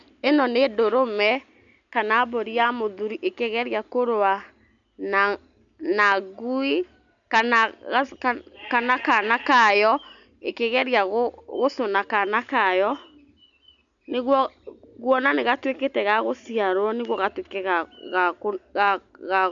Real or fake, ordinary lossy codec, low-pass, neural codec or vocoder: real; MP3, 96 kbps; 7.2 kHz; none